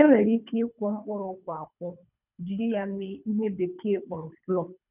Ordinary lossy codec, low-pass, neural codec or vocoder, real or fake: none; 3.6 kHz; codec, 24 kHz, 3 kbps, HILCodec; fake